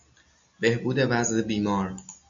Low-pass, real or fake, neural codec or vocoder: 7.2 kHz; real; none